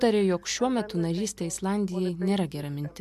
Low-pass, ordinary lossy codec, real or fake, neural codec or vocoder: 14.4 kHz; MP3, 96 kbps; real; none